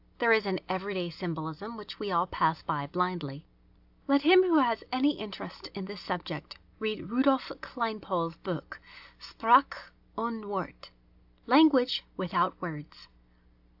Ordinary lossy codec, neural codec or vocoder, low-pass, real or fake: AAC, 48 kbps; none; 5.4 kHz; real